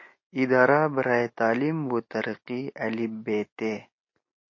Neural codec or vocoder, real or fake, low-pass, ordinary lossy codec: none; real; 7.2 kHz; MP3, 32 kbps